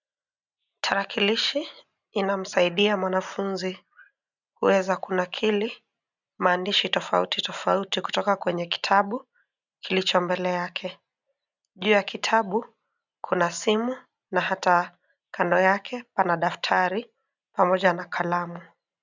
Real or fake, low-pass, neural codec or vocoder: real; 7.2 kHz; none